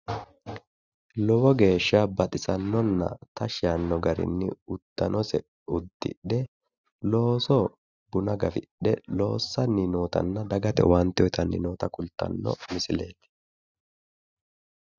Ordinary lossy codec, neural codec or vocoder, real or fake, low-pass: Opus, 64 kbps; none; real; 7.2 kHz